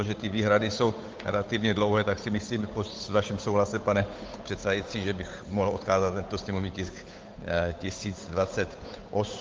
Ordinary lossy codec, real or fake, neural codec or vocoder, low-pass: Opus, 24 kbps; fake; codec, 16 kHz, 8 kbps, FunCodec, trained on Chinese and English, 25 frames a second; 7.2 kHz